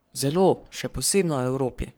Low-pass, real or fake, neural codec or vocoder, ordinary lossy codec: none; fake; codec, 44.1 kHz, 3.4 kbps, Pupu-Codec; none